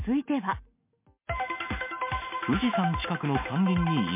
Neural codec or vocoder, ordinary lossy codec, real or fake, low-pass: none; MP3, 24 kbps; real; 3.6 kHz